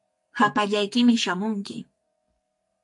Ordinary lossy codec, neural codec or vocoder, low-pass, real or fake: MP3, 48 kbps; codec, 44.1 kHz, 2.6 kbps, SNAC; 10.8 kHz; fake